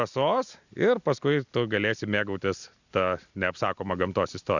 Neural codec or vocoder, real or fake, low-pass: none; real; 7.2 kHz